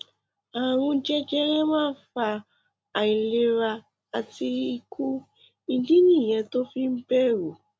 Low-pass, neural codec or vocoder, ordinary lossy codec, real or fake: none; none; none; real